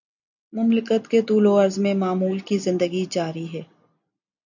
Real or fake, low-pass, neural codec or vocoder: real; 7.2 kHz; none